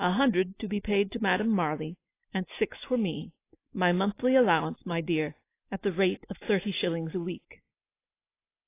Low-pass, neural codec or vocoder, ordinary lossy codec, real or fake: 3.6 kHz; none; AAC, 24 kbps; real